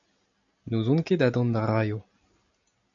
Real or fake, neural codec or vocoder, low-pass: real; none; 7.2 kHz